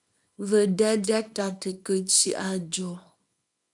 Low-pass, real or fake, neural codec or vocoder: 10.8 kHz; fake; codec, 24 kHz, 0.9 kbps, WavTokenizer, small release